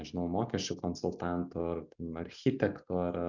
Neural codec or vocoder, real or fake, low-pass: none; real; 7.2 kHz